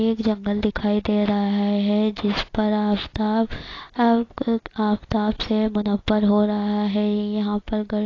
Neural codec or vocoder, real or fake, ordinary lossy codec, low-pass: none; real; AAC, 32 kbps; 7.2 kHz